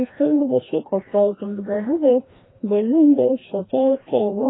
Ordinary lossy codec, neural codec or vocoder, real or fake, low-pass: AAC, 16 kbps; codec, 16 kHz, 1 kbps, FreqCodec, larger model; fake; 7.2 kHz